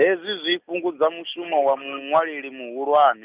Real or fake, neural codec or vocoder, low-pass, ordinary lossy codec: real; none; 3.6 kHz; Opus, 64 kbps